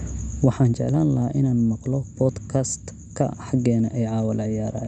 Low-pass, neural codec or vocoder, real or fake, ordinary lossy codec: 9.9 kHz; none; real; none